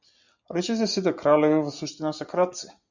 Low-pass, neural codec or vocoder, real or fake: 7.2 kHz; none; real